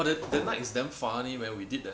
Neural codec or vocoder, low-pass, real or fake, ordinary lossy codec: none; none; real; none